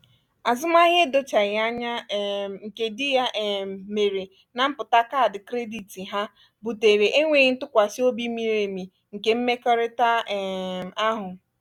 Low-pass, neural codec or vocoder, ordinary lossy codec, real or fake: 19.8 kHz; none; none; real